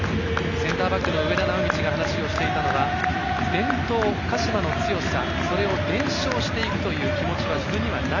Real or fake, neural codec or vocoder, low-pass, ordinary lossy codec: real; none; 7.2 kHz; none